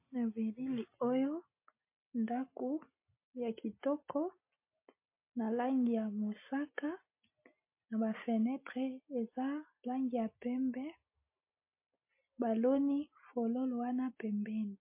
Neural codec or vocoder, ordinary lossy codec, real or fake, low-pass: none; MP3, 24 kbps; real; 3.6 kHz